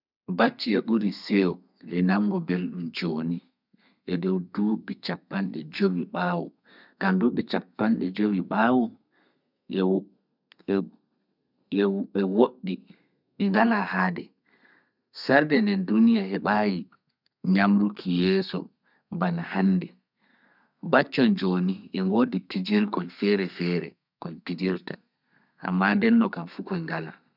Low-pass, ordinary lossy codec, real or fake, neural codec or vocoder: 5.4 kHz; none; fake; codec, 32 kHz, 1.9 kbps, SNAC